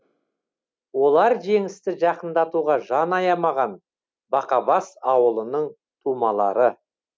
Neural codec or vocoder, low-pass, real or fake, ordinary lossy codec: none; none; real; none